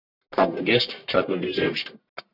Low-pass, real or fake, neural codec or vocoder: 5.4 kHz; fake; codec, 44.1 kHz, 1.7 kbps, Pupu-Codec